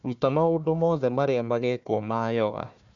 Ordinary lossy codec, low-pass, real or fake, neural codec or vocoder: none; 7.2 kHz; fake; codec, 16 kHz, 1 kbps, FunCodec, trained on Chinese and English, 50 frames a second